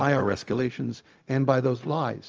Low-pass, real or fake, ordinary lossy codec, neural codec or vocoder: 7.2 kHz; fake; Opus, 24 kbps; codec, 16 kHz, 0.4 kbps, LongCat-Audio-Codec